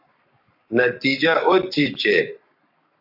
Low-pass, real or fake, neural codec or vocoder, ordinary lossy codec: 5.4 kHz; fake; codec, 44.1 kHz, 7.8 kbps, Pupu-Codec; AAC, 48 kbps